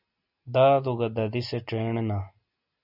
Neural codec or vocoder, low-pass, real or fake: none; 5.4 kHz; real